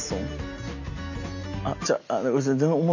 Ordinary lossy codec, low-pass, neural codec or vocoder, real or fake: none; 7.2 kHz; none; real